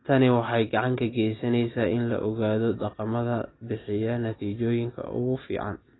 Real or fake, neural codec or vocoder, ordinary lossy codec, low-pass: real; none; AAC, 16 kbps; 7.2 kHz